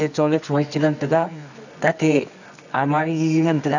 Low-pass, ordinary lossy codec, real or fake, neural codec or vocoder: 7.2 kHz; none; fake; codec, 24 kHz, 0.9 kbps, WavTokenizer, medium music audio release